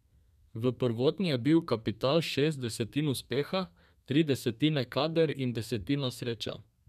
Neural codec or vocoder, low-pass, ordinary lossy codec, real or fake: codec, 32 kHz, 1.9 kbps, SNAC; 14.4 kHz; none; fake